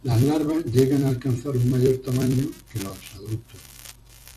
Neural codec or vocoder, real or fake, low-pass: vocoder, 44.1 kHz, 128 mel bands every 256 samples, BigVGAN v2; fake; 14.4 kHz